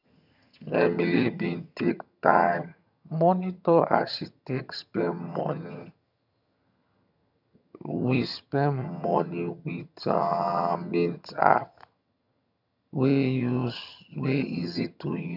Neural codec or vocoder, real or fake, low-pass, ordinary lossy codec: vocoder, 22.05 kHz, 80 mel bands, HiFi-GAN; fake; 5.4 kHz; none